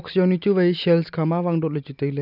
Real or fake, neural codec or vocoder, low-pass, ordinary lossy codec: real; none; 5.4 kHz; none